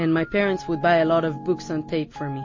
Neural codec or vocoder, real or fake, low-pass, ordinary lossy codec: none; real; 7.2 kHz; MP3, 32 kbps